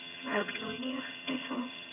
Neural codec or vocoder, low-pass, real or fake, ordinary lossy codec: vocoder, 22.05 kHz, 80 mel bands, HiFi-GAN; 3.6 kHz; fake; none